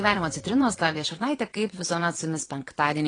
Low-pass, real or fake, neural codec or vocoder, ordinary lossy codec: 9.9 kHz; fake; vocoder, 22.05 kHz, 80 mel bands, WaveNeXt; AAC, 32 kbps